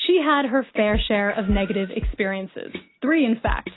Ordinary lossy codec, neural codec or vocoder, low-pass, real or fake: AAC, 16 kbps; none; 7.2 kHz; real